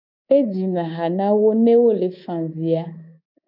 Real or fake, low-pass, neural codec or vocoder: fake; 5.4 kHz; codec, 24 kHz, 3.1 kbps, DualCodec